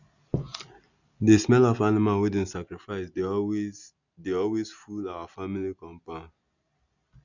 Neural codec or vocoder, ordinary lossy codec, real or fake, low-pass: none; none; real; 7.2 kHz